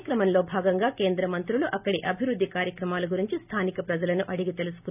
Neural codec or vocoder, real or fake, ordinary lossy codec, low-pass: none; real; none; 3.6 kHz